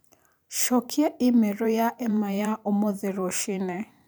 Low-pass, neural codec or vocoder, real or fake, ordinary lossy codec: none; vocoder, 44.1 kHz, 128 mel bands every 512 samples, BigVGAN v2; fake; none